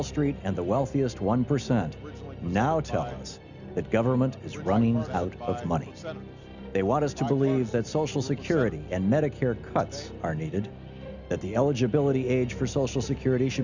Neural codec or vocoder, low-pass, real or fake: none; 7.2 kHz; real